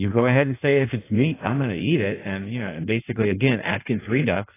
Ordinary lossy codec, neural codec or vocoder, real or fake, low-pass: AAC, 16 kbps; codec, 16 kHz in and 24 kHz out, 1.1 kbps, FireRedTTS-2 codec; fake; 3.6 kHz